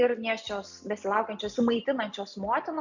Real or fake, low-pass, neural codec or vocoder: real; 7.2 kHz; none